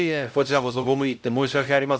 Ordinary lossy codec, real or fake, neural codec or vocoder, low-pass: none; fake; codec, 16 kHz, 0.5 kbps, X-Codec, HuBERT features, trained on LibriSpeech; none